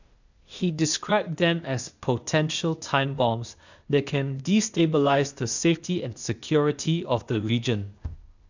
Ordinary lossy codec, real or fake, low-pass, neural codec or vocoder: none; fake; 7.2 kHz; codec, 16 kHz, 0.8 kbps, ZipCodec